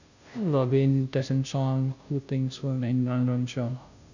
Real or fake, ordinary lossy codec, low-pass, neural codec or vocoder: fake; none; 7.2 kHz; codec, 16 kHz, 0.5 kbps, FunCodec, trained on Chinese and English, 25 frames a second